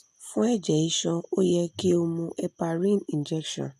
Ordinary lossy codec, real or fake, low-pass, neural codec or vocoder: none; real; 14.4 kHz; none